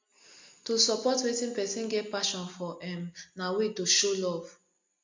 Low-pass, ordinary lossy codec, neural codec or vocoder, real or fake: 7.2 kHz; MP3, 64 kbps; none; real